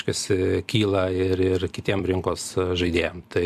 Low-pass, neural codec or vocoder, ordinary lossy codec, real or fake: 14.4 kHz; vocoder, 44.1 kHz, 128 mel bands every 256 samples, BigVGAN v2; AAC, 96 kbps; fake